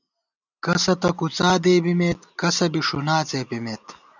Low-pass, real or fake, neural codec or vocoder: 7.2 kHz; real; none